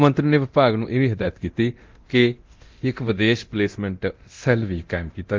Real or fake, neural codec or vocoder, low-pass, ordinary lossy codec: fake; codec, 24 kHz, 0.9 kbps, DualCodec; 7.2 kHz; Opus, 32 kbps